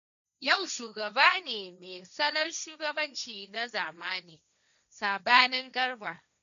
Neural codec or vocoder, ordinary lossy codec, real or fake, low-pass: codec, 16 kHz, 1.1 kbps, Voila-Tokenizer; none; fake; 7.2 kHz